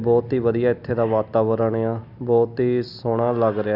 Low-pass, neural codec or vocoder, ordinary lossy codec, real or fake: 5.4 kHz; none; none; real